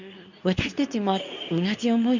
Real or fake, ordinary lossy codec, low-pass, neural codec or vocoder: fake; none; 7.2 kHz; codec, 24 kHz, 0.9 kbps, WavTokenizer, medium speech release version 2